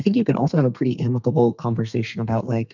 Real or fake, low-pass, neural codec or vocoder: fake; 7.2 kHz; codec, 32 kHz, 1.9 kbps, SNAC